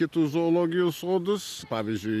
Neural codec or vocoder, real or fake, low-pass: none; real; 14.4 kHz